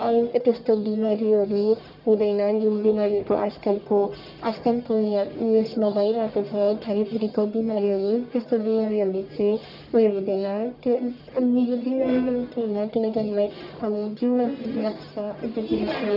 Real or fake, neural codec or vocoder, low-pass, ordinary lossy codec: fake; codec, 44.1 kHz, 1.7 kbps, Pupu-Codec; 5.4 kHz; none